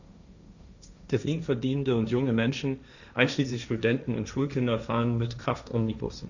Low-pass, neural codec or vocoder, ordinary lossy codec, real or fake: 7.2 kHz; codec, 16 kHz, 1.1 kbps, Voila-Tokenizer; none; fake